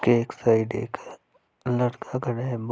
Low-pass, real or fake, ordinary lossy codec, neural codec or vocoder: none; real; none; none